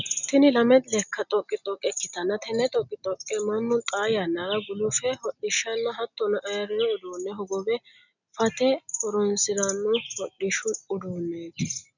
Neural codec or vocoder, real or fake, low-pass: none; real; 7.2 kHz